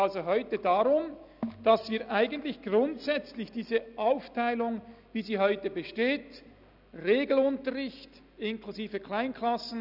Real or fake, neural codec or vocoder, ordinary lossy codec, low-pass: real; none; none; 5.4 kHz